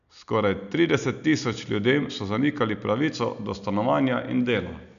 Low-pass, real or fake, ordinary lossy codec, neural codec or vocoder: 7.2 kHz; real; none; none